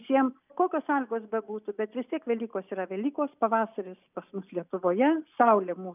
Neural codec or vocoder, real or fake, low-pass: none; real; 3.6 kHz